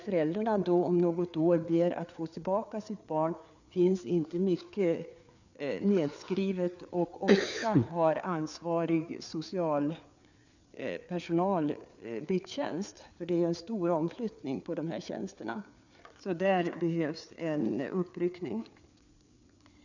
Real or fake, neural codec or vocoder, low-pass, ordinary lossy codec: fake; codec, 16 kHz, 4 kbps, FreqCodec, larger model; 7.2 kHz; none